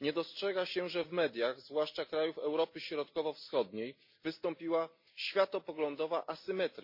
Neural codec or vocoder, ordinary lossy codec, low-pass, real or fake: none; MP3, 32 kbps; 5.4 kHz; real